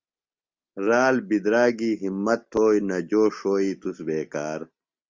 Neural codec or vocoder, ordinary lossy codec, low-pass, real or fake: none; Opus, 32 kbps; 7.2 kHz; real